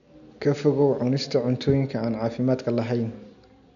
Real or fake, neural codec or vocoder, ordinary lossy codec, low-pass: real; none; none; 7.2 kHz